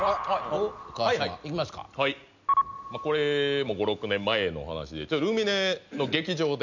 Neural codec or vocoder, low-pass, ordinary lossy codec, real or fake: none; 7.2 kHz; none; real